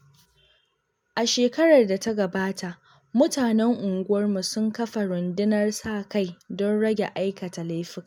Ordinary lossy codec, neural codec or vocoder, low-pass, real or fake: MP3, 96 kbps; none; 19.8 kHz; real